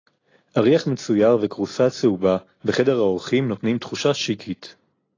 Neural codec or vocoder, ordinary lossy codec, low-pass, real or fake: none; AAC, 32 kbps; 7.2 kHz; real